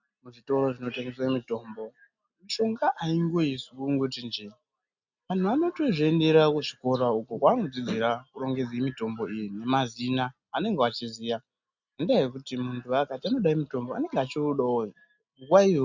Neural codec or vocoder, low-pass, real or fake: none; 7.2 kHz; real